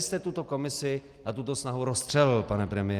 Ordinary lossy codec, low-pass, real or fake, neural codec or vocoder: Opus, 32 kbps; 14.4 kHz; real; none